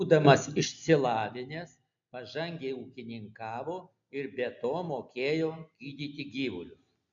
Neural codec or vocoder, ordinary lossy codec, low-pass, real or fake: none; AAC, 64 kbps; 7.2 kHz; real